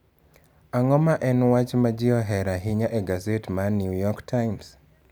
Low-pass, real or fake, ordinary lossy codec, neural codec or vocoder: none; real; none; none